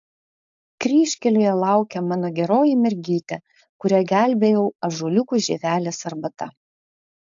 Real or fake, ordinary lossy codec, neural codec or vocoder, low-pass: fake; AAC, 64 kbps; codec, 16 kHz, 4.8 kbps, FACodec; 7.2 kHz